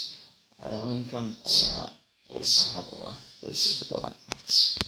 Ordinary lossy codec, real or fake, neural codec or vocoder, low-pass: none; fake; codec, 44.1 kHz, 2.6 kbps, DAC; none